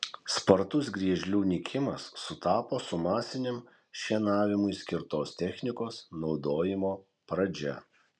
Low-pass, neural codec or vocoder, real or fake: 9.9 kHz; none; real